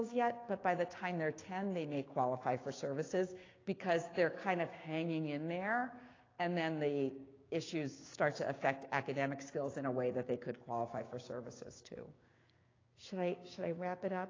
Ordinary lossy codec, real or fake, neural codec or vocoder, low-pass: AAC, 32 kbps; fake; codec, 16 kHz, 6 kbps, DAC; 7.2 kHz